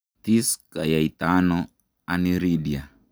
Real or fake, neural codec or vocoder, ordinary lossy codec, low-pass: real; none; none; none